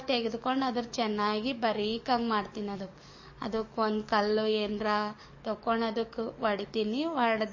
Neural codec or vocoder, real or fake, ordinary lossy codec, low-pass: codec, 44.1 kHz, 7.8 kbps, DAC; fake; MP3, 32 kbps; 7.2 kHz